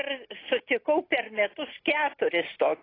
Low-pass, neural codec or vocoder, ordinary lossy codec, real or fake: 5.4 kHz; none; AAC, 24 kbps; real